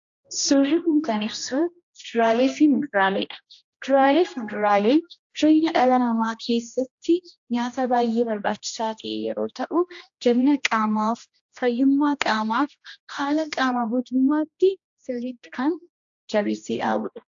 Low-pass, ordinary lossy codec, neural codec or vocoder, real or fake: 7.2 kHz; AAC, 64 kbps; codec, 16 kHz, 1 kbps, X-Codec, HuBERT features, trained on general audio; fake